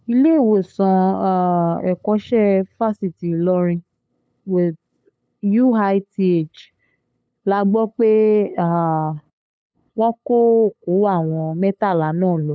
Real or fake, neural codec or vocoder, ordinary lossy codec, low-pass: fake; codec, 16 kHz, 8 kbps, FunCodec, trained on LibriTTS, 25 frames a second; none; none